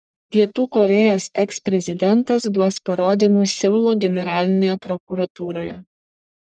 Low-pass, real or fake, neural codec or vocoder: 9.9 kHz; fake; codec, 44.1 kHz, 1.7 kbps, Pupu-Codec